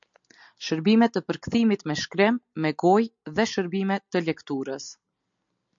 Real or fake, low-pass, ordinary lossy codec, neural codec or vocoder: real; 7.2 kHz; MP3, 64 kbps; none